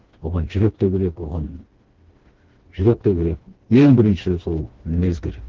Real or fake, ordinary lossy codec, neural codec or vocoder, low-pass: fake; Opus, 16 kbps; codec, 16 kHz, 2 kbps, FreqCodec, smaller model; 7.2 kHz